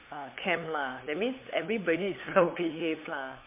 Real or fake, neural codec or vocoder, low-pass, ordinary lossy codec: fake; codec, 16 kHz, 8 kbps, FunCodec, trained on LibriTTS, 25 frames a second; 3.6 kHz; MP3, 24 kbps